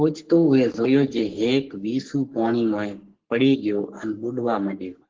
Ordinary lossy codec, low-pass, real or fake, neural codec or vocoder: Opus, 16 kbps; 7.2 kHz; fake; codec, 44.1 kHz, 3.4 kbps, Pupu-Codec